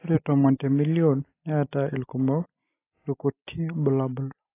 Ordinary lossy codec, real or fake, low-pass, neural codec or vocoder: AAC, 24 kbps; real; 3.6 kHz; none